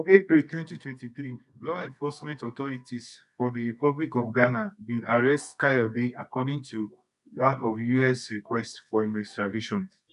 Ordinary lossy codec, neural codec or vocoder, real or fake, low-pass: none; codec, 24 kHz, 0.9 kbps, WavTokenizer, medium music audio release; fake; 10.8 kHz